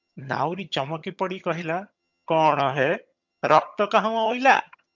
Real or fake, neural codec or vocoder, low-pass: fake; vocoder, 22.05 kHz, 80 mel bands, HiFi-GAN; 7.2 kHz